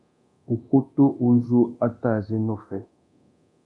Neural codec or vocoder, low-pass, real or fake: codec, 24 kHz, 0.9 kbps, DualCodec; 10.8 kHz; fake